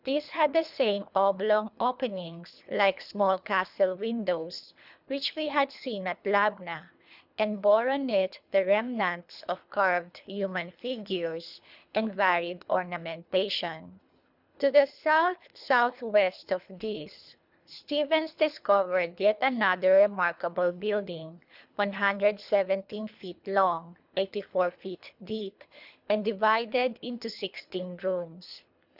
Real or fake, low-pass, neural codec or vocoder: fake; 5.4 kHz; codec, 24 kHz, 3 kbps, HILCodec